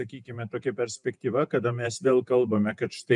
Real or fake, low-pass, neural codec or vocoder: fake; 10.8 kHz; vocoder, 48 kHz, 128 mel bands, Vocos